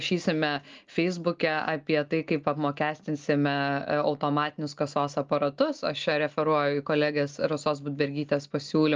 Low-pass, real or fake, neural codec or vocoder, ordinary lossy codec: 7.2 kHz; real; none; Opus, 24 kbps